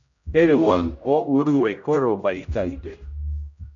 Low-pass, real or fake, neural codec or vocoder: 7.2 kHz; fake; codec, 16 kHz, 0.5 kbps, X-Codec, HuBERT features, trained on general audio